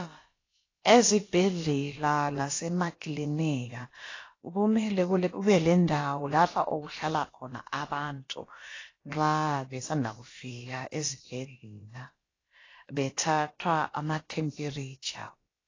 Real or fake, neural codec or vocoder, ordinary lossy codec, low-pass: fake; codec, 16 kHz, about 1 kbps, DyCAST, with the encoder's durations; AAC, 32 kbps; 7.2 kHz